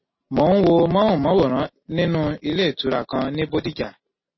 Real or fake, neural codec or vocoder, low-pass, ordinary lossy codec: real; none; 7.2 kHz; MP3, 24 kbps